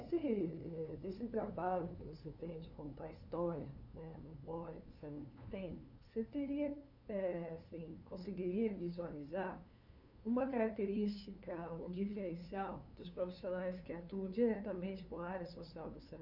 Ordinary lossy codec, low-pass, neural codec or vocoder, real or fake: none; 5.4 kHz; codec, 16 kHz, 2 kbps, FunCodec, trained on LibriTTS, 25 frames a second; fake